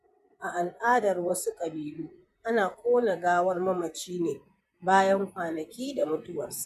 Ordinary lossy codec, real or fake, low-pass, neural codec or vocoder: none; fake; 14.4 kHz; vocoder, 44.1 kHz, 128 mel bands, Pupu-Vocoder